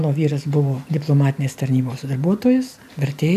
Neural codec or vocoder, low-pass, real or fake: none; 14.4 kHz; real